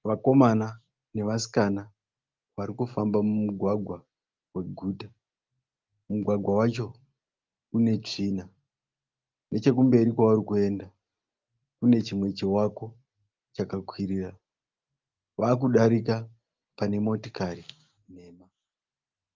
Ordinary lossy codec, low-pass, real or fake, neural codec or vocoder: Opus, 32 kbps; 7.2 kHz; real; none